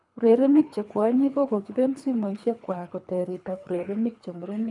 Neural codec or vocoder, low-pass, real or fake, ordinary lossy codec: codec, 24 kHz, 3 kbps, HILCodec; 10.8 kHz; fake; none